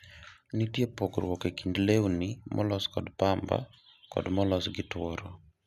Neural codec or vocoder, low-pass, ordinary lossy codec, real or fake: none; 14.4 kHz; none; real